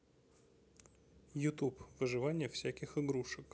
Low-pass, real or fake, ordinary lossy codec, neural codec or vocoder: none; real; none; none